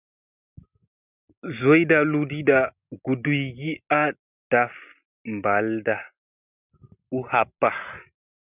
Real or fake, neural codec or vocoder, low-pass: real; none; 3.6 kHz